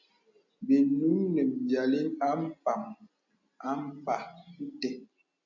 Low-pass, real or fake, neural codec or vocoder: 7.2 kHz; real; none